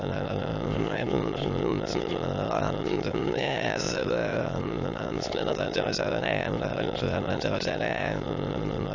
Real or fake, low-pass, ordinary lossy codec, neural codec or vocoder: fake; 7.2 kHz; AAC, 48 kbps; autoencoder, 22.05 kHz, a latent of 192 numbers a frame, VITS, trained on many speakers